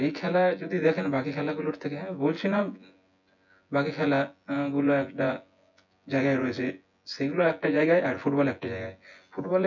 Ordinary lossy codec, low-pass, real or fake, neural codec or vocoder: none; 7.2 kHz; fake; vocoder, 24 kHz, 100 mel bands, Vocos